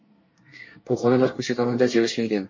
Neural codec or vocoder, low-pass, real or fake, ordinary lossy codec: codec, 24 kHz, 1 kbps, SNAC; 7.2 kHz; fake; MP3, 32 kbps